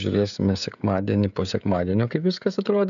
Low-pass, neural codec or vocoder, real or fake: 7.2 kHz; codec, 16 kHz, 16 kbps, FreqCodec, smaller model; fake